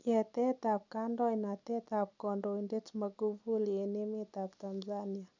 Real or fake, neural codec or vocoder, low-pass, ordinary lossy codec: real; none; 7.2 kHz; none